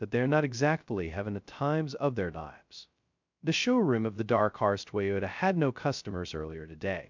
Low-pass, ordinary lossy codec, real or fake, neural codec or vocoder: 7.2 kHz; MP3, 64 kbps; fake; codec, 16 kHz, 0.2 kbps, FocalCodec